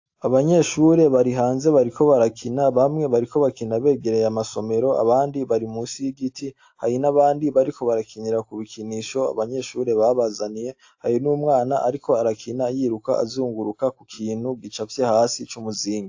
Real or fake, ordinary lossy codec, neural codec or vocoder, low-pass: real; AAC, 48 kbps; none; 7.2 kHz